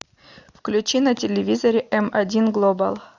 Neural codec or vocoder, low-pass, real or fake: none; 7.2 kHz; real